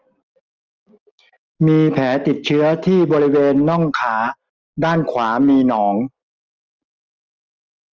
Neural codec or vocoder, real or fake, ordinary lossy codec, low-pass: none; real; Opus, 24 kbps; 7.2 kHz